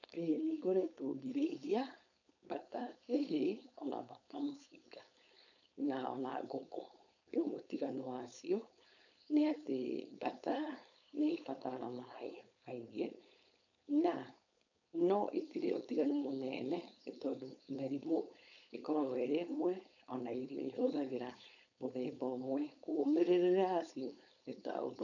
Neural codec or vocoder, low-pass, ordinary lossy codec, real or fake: codec, 16 kHz, 4.8 kbps, FACodec; 7.2 kHz; none; fake